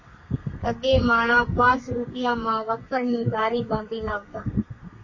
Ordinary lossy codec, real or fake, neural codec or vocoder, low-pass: MP3, 32 kbps; fake; codec, 32 kHz, 1.9 kbps, SNAC; 7.2 kHz